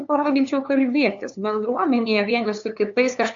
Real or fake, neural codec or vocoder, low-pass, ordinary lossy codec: fake; codec, 16 kHz, 2 kbps, FunCodec, trained on LibriTTS, 25 frames a second; 7.2 kHz; AAC, 64 kbps